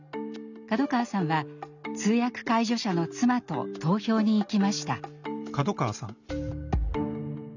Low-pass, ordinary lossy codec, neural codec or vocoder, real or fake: 7.2 kHz; none; none; real